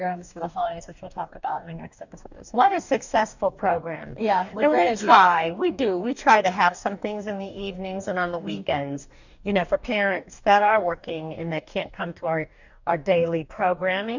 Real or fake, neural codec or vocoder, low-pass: fake; codec, 44.1 kHz, 2.6 kbps, DAC; 7.2 kHz